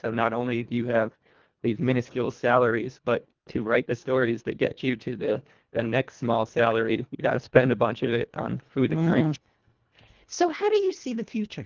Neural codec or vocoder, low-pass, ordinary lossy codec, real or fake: codec, 24 kHz, 1.5 kbps, HILCodec; 7.2 kHz; Opus, 32 kbps; fake